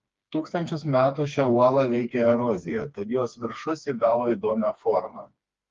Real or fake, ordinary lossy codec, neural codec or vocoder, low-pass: fake; Opus, 32 kbps; codec, 16 kHz, 2 kbps, FreqCodec, smaller model; 7.2 kHz